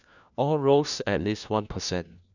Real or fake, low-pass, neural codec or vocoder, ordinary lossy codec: fake; 7.2 kHz; codec, 16 kHz, 1 kbps, FunCodec, trained on LibriTTS, 50 frames a second; none